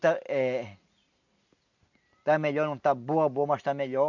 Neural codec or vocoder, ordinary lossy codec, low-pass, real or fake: none; none; 7.2 kHz; real